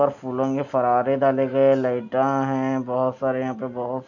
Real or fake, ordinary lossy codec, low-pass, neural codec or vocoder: real; none; 7.2 kHz; none